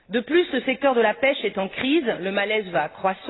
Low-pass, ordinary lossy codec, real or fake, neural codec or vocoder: 7.2 kHz; AAC, 16 kbps; real; none